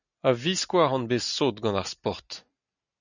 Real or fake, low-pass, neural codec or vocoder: real; 7.2 kHz; none